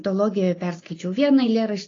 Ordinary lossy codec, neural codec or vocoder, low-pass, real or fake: AAC, 32 kbps; none; 7.2 kHz; real